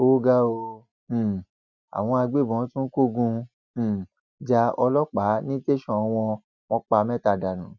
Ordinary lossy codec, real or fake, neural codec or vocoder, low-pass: none; real; none; 7.2 kHz